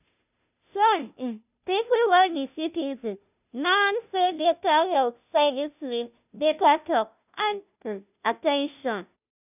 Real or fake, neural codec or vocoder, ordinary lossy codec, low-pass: fake; codec, 16 kHz, 0.5 kbps, FunCodec, trained on Chinese and English, 25 frames a second; none; 3.6 kHz